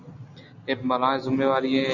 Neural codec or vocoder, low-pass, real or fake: none; 7.2 kHz; real